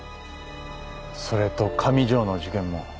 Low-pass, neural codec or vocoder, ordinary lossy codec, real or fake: none; none; none; real